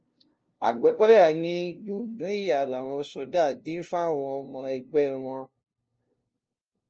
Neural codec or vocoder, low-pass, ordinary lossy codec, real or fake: codec, 16 kHz, 0.5 kbps, FunCodec, trained on LibriTTS, 25 frames a second; 7.2 kHz; Opus, 24 kbps; fake